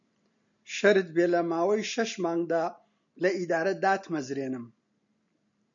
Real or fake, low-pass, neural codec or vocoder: real; 7.2 kHz; none